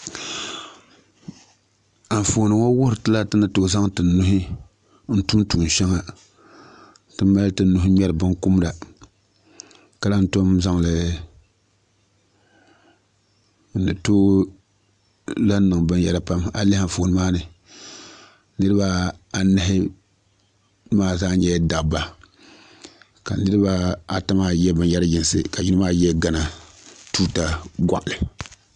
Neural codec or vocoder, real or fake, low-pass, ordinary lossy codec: none; real; 9.9 kHz; AAC, 96 kbps